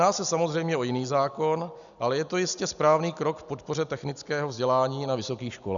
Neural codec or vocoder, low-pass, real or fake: none; 7.2 kHz; real